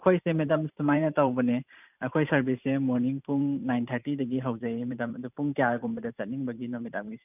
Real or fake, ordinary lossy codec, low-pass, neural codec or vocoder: fake; none; 3.6 kHz; vocoder, 44.1 kHz, 128 mel bands every 512 samples, BigVGAN v2